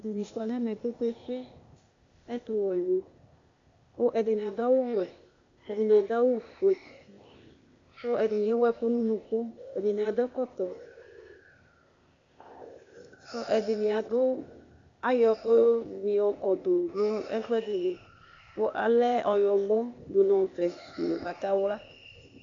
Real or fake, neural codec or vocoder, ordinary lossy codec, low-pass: fake; codec, 16 kHz, 0.8 kbps, ZipCodec; AAC, 64 kbps; 7.2 kHz